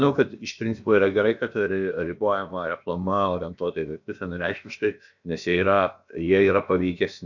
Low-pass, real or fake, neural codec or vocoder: 7.2 kHz; fake; codec, 16 kHz, about 1 kbps, DyCAST, with the encoder's durations